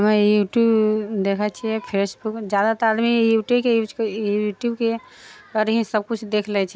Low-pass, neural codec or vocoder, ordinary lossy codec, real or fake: none; none; none; real